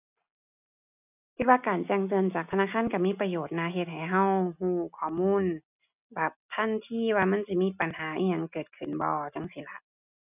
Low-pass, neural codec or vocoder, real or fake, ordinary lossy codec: 3.6 kHz; none; real; none